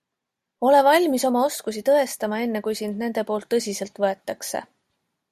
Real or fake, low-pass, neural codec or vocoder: real; 14.4 kHz; none